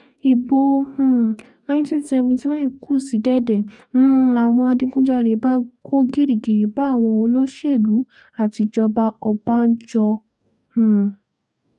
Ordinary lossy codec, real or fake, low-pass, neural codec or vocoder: none; fake; 10.8 kHz; codec, 44.1 kHz, 2.6 kbps, DAC